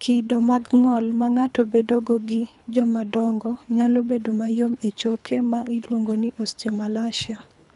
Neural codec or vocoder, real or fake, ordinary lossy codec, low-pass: codec, 24 kHz, 3 kbps, HILCodec; fake; none; 10.8 kHz